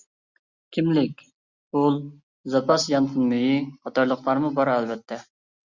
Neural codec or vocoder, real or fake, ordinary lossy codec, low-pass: none; real; Opus, 64 kbps; 7.2 kHz